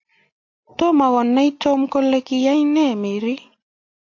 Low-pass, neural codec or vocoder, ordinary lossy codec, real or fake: 7.2 kHz; none; AAC, 48 kbps; real